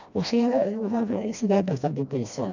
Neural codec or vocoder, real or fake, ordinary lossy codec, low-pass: codec, 16 kHz, 1 kbps, FreqCodec, smaller model; fake; none; 7.2 kHz